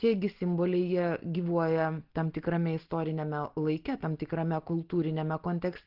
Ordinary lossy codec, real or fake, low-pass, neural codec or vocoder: Opus, 16 kbps; real; 5.4 kHz; none